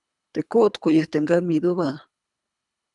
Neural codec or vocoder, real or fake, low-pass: codec, 24 kHz, 3 kbps, HILCodec; fake; 10.8 kHz